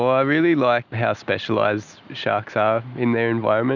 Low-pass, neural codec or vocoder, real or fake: 7.2 kHz; none; real